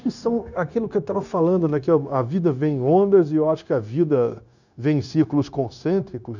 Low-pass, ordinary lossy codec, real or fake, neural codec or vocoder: 7.2 kHz; none; fake; codec, 16 kHz, 0.9 kbps, LongCat-Audio-Codec